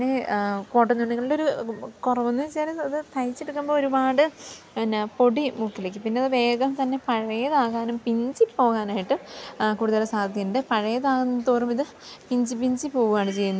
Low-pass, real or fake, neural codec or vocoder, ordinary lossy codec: none; real; none; none